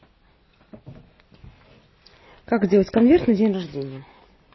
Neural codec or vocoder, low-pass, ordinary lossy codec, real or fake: none; 7.2 kHz; MP3, 24 kbps; real